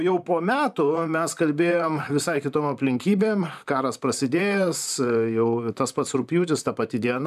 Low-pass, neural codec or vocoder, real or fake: 14.4 kHz; vocoder, 44.1 kHz, 128 mel bands, Pupu-Vocoder; fake